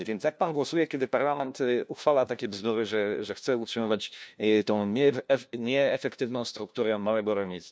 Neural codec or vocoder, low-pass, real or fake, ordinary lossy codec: codec, 16 kHz, 1 kbps, FunCodec, trained on LibriTTS, 50 frames a second; none; fake; none